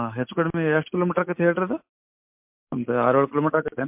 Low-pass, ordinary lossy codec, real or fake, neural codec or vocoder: 3.6 kHz; MP3, 32 kbps; real; none